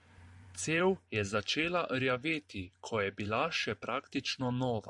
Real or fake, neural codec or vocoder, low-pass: fake; vocoder, 44.1 kHz, 128 mel bands every 512 samples, BigVGAN v2; 10.8 kHz